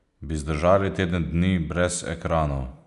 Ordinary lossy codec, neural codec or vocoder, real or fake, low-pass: none; none; real; 10.8 kHz